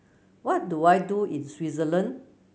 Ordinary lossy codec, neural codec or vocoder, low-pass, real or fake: none; none; none; real